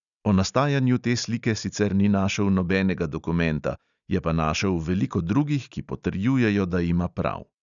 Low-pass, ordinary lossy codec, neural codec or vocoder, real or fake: 7.2 kHz; none; none; real